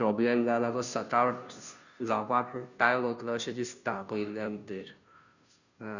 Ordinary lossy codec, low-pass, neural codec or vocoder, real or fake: none; 7.2 kHz; codec, 16 kHz, 0.5 kbps, FunCodec, trained on Chinese and English, 25 frames a second; fake